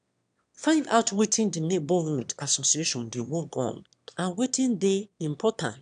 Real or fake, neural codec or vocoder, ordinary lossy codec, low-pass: fake; autoencoder, 22.05 kHz, a latent of 192 numbers a frame, VITS, trained on one speaker; none; 9.9 kHz